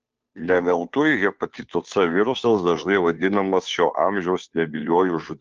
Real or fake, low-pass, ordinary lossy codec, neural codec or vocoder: fake; 7.2 kHz; Opus, 24 kbps; codec, 16 kHz, 2 kbps, FunCodec, trained on Chinese and English, 25 frames a second